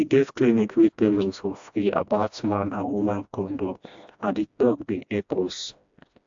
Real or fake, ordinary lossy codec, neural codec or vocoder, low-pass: fake; AAC, 64 kbps; codec, 16 kHz, 1 kbps, FreqCodec, smaller model; 7.2 kHz